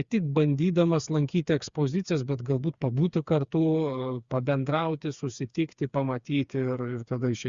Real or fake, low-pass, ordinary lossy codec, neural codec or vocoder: fake; 7.2 kHz; Opus, 64 kbps; codec, 16 kHz, 4 kbps, FreqCodec, smaller model